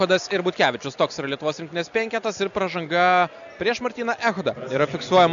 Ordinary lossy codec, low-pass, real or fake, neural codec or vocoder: MP3, 96 kbps; 7.2 kHz; real; none